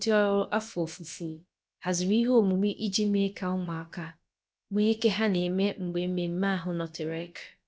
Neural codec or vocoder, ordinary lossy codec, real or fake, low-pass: codec, 16 kHz, about 1 kbps, DyCAST, with the encoder's durations; none; fake; none